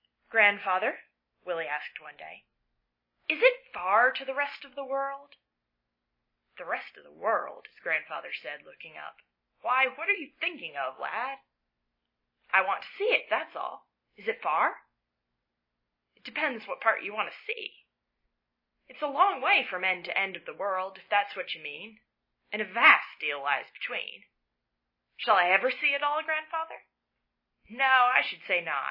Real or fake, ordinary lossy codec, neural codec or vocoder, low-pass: real; MP3, 24 kbps; none; 5.4 kHz